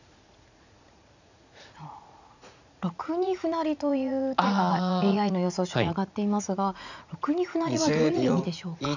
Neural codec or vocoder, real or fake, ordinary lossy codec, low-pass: vocoder, 44.1 kHz, 80 mel bands, Vocos; fake; none; 7.2 kHz